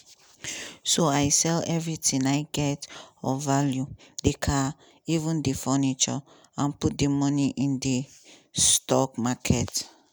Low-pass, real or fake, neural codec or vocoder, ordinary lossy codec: none; real; none; none